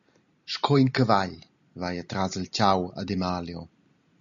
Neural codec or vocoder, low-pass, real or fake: none; 7.2 kHz; real